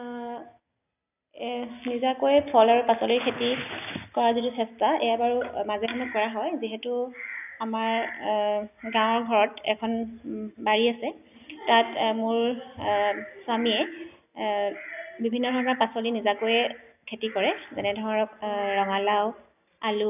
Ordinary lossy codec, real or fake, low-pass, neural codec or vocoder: none; real; 3.6 kHz; none